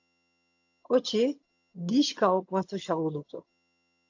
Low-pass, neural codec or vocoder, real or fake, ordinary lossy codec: 7.2 kHz; vocoder, 22.05 kHz, 80 mel bands, HiFi-GAN; fake; AAC, 48 kbps